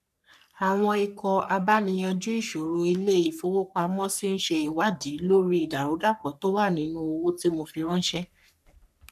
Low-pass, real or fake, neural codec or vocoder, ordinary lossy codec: 14.4 kHz; fake; codec, 44.1 kHz, 3.4 kbps, Pupu-Codec; none